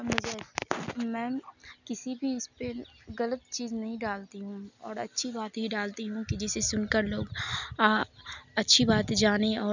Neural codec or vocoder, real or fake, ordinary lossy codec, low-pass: none; real; none; 7.2 kHz